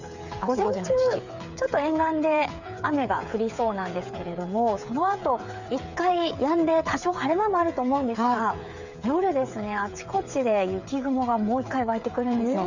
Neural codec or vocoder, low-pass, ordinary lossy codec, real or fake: codec, 16 kHz, 8 kbps, FreqCodec, smaller model; 7.2 kHz; none; fake